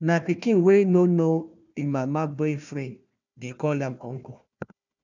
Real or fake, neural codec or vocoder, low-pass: fake; codec, 16 kHz, 1 kbps, FunCodec, trained on Chinese and English, 50 frames a second; 7.2 kHz